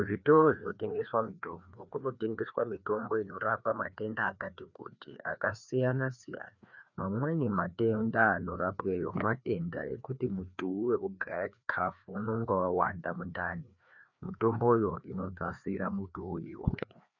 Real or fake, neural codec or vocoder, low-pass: fake; codec, 16 kHz, 2 kbps, FreqCodec, larger model; 7.2 kHz